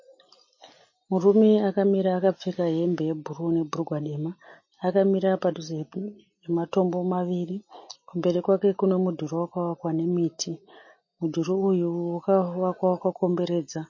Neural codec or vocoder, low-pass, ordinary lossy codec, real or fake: none; 7.2 kHz; MP3, 32 kbps; real